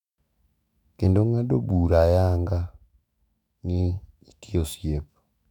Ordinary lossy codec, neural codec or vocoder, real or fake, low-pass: none; autoencoder, 48 kHz, 128 numbers a frame, DAC-VAE, trained on Japanese speech; fake; 19.8 kHz